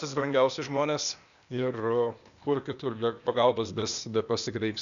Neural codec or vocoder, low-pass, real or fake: codec, 16 kHz, 0.8 kbps, ZipCodec; 7.2 kHz; fake